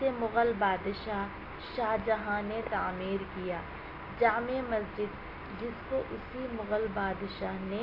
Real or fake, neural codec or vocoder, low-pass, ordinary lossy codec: real; none; 5.4 kHz; AAC, 32 kbps